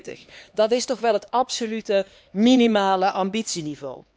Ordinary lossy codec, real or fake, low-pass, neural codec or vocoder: none; fake; none; codec, 16 kHz, 2 kbps, X-Codec, HuBERT features, trained on LibriSpeech